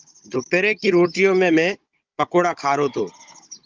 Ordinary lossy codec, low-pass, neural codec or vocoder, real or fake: Opus, 24 kbps; 7.2 kHz; codec, 16 kHz, 16 kbps, FunCodec, trained on Chinese and English, 50 frames a second; fake